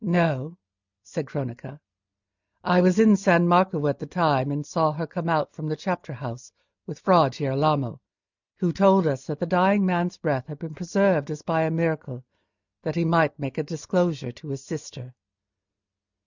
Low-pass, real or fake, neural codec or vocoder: 7.2 kHz; real; none